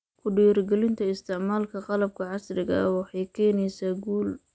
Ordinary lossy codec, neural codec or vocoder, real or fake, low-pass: none; none; real; none